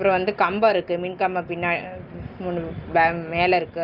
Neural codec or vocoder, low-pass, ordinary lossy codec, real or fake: none; 5.4 kHz; Opus, 24 kbps; real